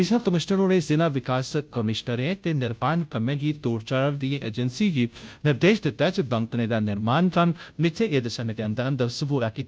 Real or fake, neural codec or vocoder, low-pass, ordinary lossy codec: fake; codec, 16 kHz, 0.5 kbps, FunCodec, trained on Chinese and English, 25 frames a second; none; none